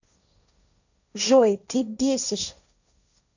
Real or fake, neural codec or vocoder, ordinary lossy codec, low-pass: fake; codec, 16 kHz, 1.1 kbps, Voila-Tokenizer; none; 7.2 kHz